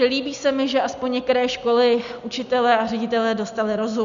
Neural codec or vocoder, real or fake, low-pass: none; real; 7.2 kHz